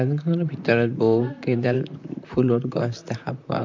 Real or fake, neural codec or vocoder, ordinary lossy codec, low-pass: fake; vocoder, 44.1 kHz, 128 mel bands, Pupu-Vocoder; MP3, 64 kbps; 7.2 kHz